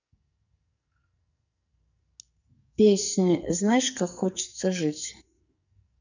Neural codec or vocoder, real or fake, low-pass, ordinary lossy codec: codec, 44.1 kHz, 2.6 kbps, SNAC; fake; 7.2 kHz; none